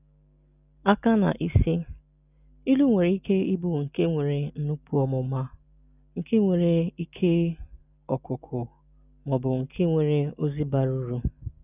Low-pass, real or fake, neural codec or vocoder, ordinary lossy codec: 3.6 kHz; real; none; none